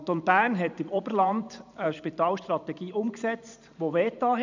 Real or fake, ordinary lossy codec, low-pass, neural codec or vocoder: fake; none; 7.2 kHz; vocoder, 44.1 kHz, 128 mel bands every 512 samples, BigVGAN v2